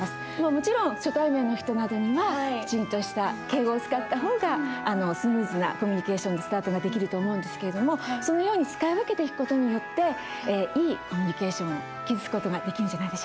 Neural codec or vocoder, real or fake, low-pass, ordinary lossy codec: none; real; none; none